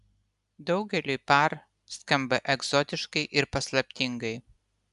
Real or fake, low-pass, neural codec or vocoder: real; 10.8 kHz; none